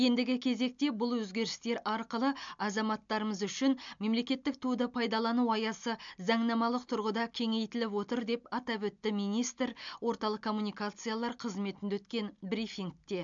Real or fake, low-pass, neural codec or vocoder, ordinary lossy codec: real; 7.2 kHz; none; MP3, 64 kbps